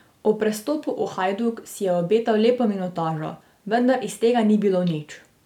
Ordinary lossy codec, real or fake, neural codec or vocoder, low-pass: none; real; none; 19.8 kHz